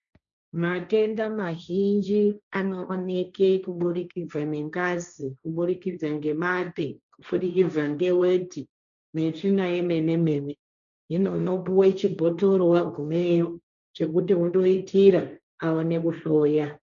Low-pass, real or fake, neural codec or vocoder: 7.2 kHz; fake; codec, 16 kHz, 1.1 kbps, Voila-Tokenizer